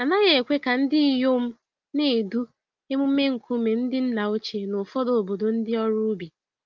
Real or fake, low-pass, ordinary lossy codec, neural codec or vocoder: real; 7.2 kHz; Opus, 32 kbps; none